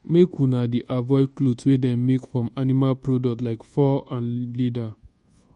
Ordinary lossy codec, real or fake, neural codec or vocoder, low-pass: MP3, 48 kbps; fake; codec, 24 kHz, 1.2 kbps, DualCodec; 10.8 kHz